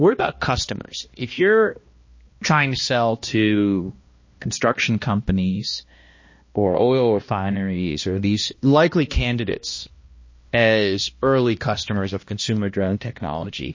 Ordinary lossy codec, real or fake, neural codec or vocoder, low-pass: MP3, 32 kbps; fake; codec, 16 kHz, 1 kbps, X-Codec, HuBERT features, trained on balanced general audio; 7.2 kHz